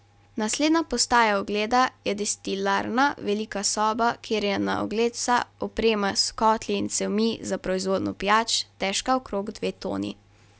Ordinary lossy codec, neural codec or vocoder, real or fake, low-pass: none; none; real; none